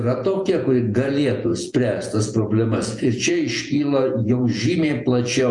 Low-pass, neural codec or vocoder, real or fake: 10.8 kHz; vocoder, 48 kHz, 128 mel bands, Vocos; fake